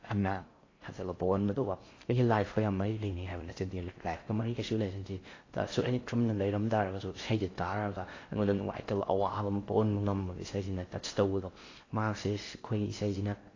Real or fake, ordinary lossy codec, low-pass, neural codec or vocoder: fake; AAC, 32 kbps; 7.2 kHz; codec, 16 kHz in and 24 kHz out, 0.6 kbps, FocalCodec, streaming, 4096 codes